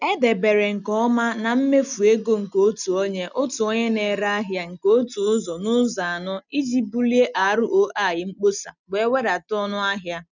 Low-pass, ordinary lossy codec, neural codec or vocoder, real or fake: 7.2 kHz; none; none; real